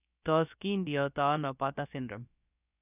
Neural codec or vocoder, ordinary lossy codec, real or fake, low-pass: codec, 16 kHz, about 1 kbps, DyCAST, with the encoder's durations; none; fake; 3.6 kHz